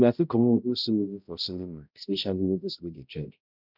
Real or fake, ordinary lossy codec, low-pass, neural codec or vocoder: fake; none; 5.4 kHz; codec, 16 kHz, 0.5 kbps, X-Codec, HuBERT features, trained on balanced general audio